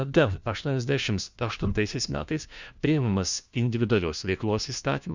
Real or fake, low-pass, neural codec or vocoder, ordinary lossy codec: fake; 7.2 kHz; codec, 16 kHz, 1 kbps, FunCodec, trained on LibriTTS, 50 frames a second; Opus, 64 kbps